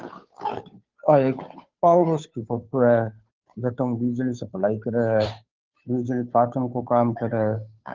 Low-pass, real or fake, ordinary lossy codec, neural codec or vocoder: 7.2 kHz; fake; Opus, 32 kbps; codec, 16 kHz, 2 kbps, FunCodec, trained on Chinese and English, 25 frames a second